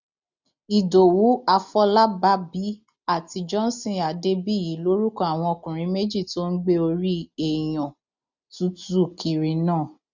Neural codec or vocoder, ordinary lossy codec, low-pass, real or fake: none; none; 7.2 kHz; real